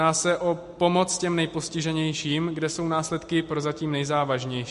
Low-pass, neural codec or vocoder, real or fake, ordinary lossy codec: 14.4 kHz; none; real; MP3, 48 kbps